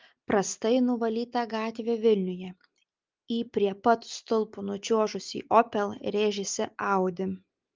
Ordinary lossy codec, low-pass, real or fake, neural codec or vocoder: Opus, 24 kbps; 7.2 kHz; real; none